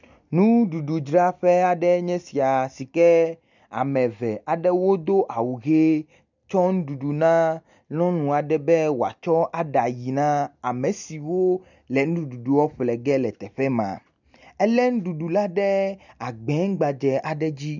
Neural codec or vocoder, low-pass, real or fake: none; 7.2 kHz; real